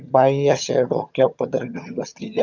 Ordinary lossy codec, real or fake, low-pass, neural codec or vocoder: none; fake; 7.2 kHz; vocoder, 22.05 kHz, 80 mel bands, HiFi-GAN